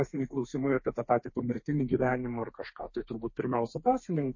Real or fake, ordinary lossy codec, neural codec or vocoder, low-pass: fake; MP3, 32 kbps; codec, 32 kHz, 1.9 kbps, SNAC; 7.2 kHz